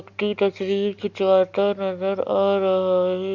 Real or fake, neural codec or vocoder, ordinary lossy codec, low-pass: real; none; Opus, 64 kbps; 7.2 kHz